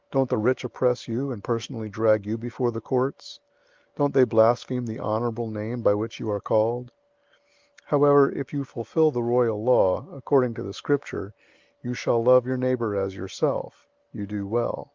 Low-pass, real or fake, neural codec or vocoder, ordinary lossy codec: 7.2 kHz; real; none; Opus, 32 kbps